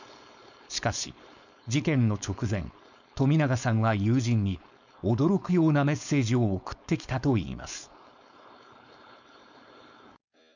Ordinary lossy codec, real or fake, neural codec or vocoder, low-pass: none; fake; codec, 16 kHz, 4.8 kbps, FACodec; 7.2 kHz